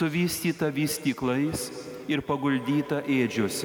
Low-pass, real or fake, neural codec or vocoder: 19.8 kHz; real; none